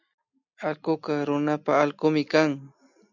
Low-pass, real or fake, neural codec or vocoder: 7.2 kHz; real; none